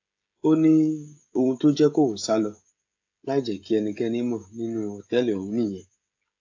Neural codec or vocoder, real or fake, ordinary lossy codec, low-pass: codec, 16 kHz, 16 kbps, FreqCodec, smaller model; fake; AAC, 48 kbps; 7.2 kHz